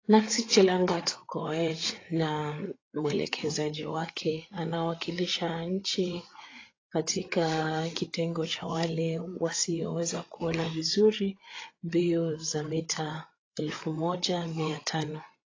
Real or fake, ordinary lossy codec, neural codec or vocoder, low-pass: fake; AAC, 32 kbps; codec, 16 kHz, 8 kbps, FreqCodec, larger model; 7.2 kHz